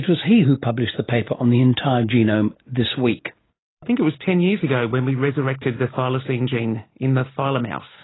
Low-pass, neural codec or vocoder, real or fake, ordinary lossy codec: 7.2 kHz; none; real; AAC, 16 kbps